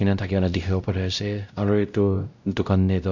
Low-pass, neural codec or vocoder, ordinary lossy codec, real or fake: 7.2 kHz; codec, 16 kHz, 0.5 kbps, X-Codec, WavLM features, trained on Multilingual LibriSpeech; none; fake